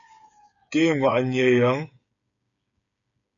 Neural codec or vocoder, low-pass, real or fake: codec, 16 kHz, 8 kbps, FreqCodec, smaller model; 7.2 kHz; fake